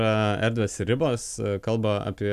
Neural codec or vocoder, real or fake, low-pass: none; real; 14.4 kHz